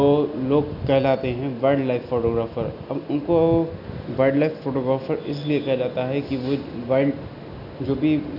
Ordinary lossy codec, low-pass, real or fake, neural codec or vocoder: none; 5.4 kHz; real; none